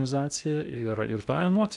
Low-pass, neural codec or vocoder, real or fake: 10.8 kHz; codec, 16 kHz in and 24 kHz out, 0.8 kbps, FocalCodec, streaming, 65536 codes; fake